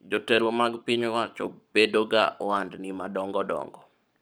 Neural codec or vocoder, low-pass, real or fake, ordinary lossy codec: codec, 44.1 kHz, 7.8 kbps, Pupu-Codec; none; fake; none